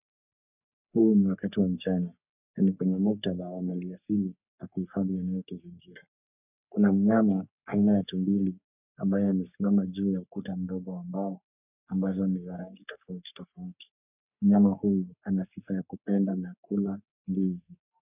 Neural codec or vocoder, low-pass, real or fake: codec, 44.1 kHz, 3.4 kbps, Pupu-Codec; 3.6 kHz; fake